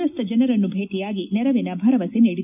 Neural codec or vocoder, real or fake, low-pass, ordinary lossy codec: none; real; 3.6 kHz; none